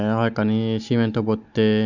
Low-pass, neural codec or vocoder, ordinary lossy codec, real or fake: 7.2 kHz; none; none; real